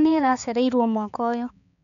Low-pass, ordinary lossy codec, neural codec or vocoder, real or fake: 7.2 kHz; none; codec, 16 kHz, 4 kbps, X-Codec, HuBERT features, trained on balanced general audio; fake